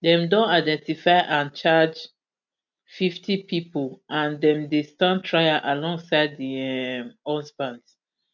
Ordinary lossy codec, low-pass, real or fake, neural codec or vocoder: none; 7.2 kHz; real; none